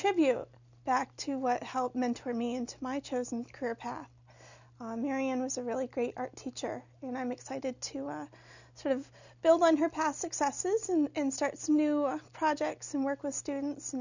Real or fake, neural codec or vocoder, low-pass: real; none; 7.2 kHz